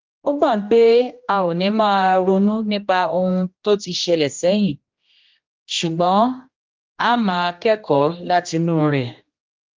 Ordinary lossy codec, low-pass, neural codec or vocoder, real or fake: Opus, 32 kbps; 7.2 kHz; codec, 16 kHz, 1 kbps, X-Codec, HuBERT features, trained on general audio; fake